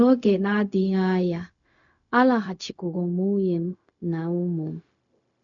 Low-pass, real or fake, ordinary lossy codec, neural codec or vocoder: 7.2 kHz; fake; none; codec, 16 kHz, 0.4 kbps, LongCat-Audio-Codec